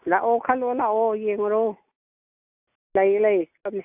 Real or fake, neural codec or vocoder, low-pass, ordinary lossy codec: real; none; 3.6 kHz; AAC, 32 kbps